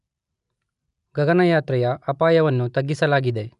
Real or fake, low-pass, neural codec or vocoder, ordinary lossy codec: real; 10.8 kHz; none; none